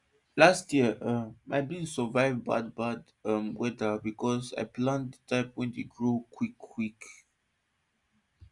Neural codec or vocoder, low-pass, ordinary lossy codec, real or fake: none; 10.8 kHz; Opus, 64 kbps; real